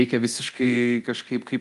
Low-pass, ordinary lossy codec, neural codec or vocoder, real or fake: 10.8 kHz; Opus, 32 kbps; codec, 24 kHz, 0.9 kbps, DualCodec; fake